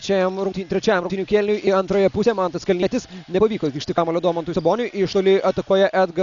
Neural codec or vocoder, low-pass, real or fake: none; 7.2 kHz; real